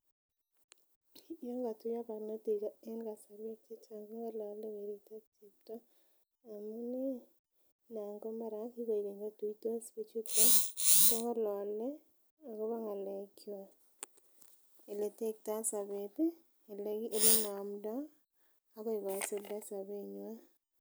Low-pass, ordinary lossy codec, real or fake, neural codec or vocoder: none; none; real; none